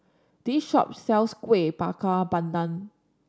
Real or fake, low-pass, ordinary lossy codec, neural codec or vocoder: real; none; none; none